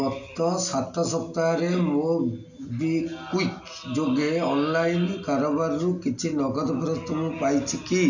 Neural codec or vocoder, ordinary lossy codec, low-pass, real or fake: none; none; 7.2 kHz; real